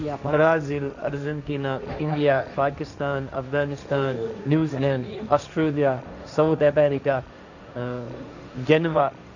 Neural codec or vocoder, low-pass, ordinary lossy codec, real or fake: codec, 16 kHz, 1.1 kbps, Voila-Tokenizer; 7.2 kHz; none; fake